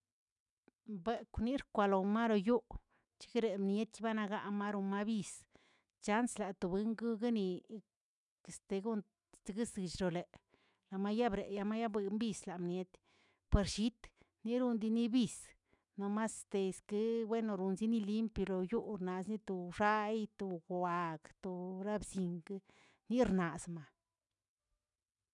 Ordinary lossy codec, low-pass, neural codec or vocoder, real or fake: none; 10.8 kHz; autoencoder, 48 kHz, 128 numbers a frame, DAC-VAE, trained on Japanese speech; fake